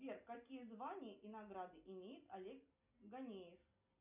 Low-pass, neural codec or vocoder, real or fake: 3.6 kHz; none; real